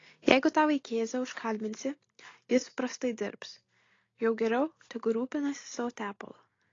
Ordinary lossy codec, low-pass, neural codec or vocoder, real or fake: AAC, 32 kbps; 7.2 kHz; none; real